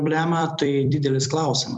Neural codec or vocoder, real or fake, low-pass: vocoder, 44.1 kHz, 128 mel bands every 256 samples, BigVGAN v2; fake; 10.8 kHz